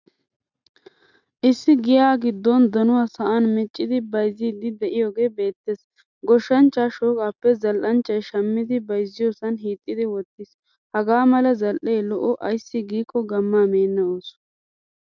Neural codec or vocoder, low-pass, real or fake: none; 7.2 kHz; real